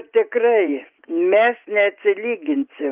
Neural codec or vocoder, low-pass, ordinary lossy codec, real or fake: vocoder, 24 kHz, 100 mel bands, Vocos; 3.6 kHz; Opus, 24 kbps; fake